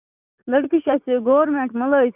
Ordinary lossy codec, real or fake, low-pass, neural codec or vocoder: none; fake; 3.6 kHz; vocoder, 44.1 kHz, 80 mel bands, Vocos